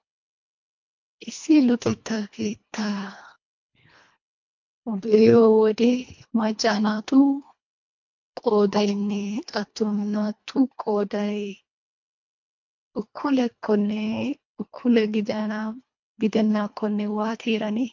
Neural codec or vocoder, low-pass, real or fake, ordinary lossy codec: codec, 24 kHz, 1.5 kbps, HILCodec; 7.2 kHz; fake; MP3, 48 kbps